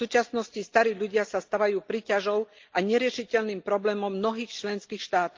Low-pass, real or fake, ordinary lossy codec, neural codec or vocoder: 7.2 kHz; real; Opus, 32 kbps; none